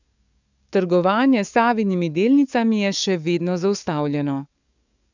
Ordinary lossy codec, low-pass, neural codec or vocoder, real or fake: none; 7.2 kHz; codec, 44.1 kHz, 7.8 kbps, DAC; fake